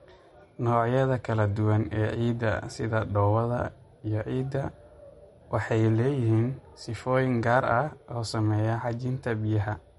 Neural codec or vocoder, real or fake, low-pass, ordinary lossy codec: none; real; 19.8 kHz; MP3, 48 kbps